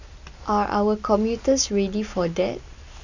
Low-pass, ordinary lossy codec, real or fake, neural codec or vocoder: 7.2 kHz; none; real; none